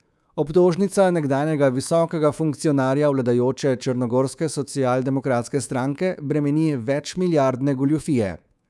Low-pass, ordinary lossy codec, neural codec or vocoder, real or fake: none; none; codec, 24 kHz, 3.1 kbps, DualCodec; fake